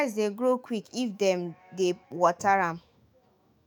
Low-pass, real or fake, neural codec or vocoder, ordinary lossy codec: none; fake; autoencoder, 48 kHz, 128 numbers a frame, DAC-VAE, trained on Japanese speech; none